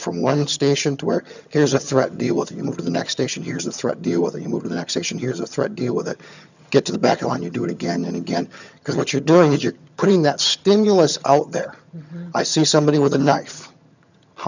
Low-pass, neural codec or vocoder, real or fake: 7.2 kHz; vocoder, 22.05 kHz, 80 mel bands, HiFi-GAN; fake